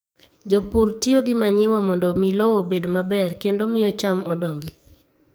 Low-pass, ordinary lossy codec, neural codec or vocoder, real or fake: none; none; codec, 44.1 kHz, 2.6 kbps, SNAC; fake